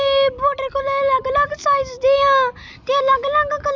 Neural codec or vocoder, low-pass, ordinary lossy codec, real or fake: none; none; none; real